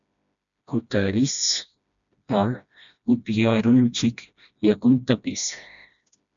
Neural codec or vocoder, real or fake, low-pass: codec, 16 kHz, 1 kbps, FreqCodec, smaller model; fake; 7.2 kHz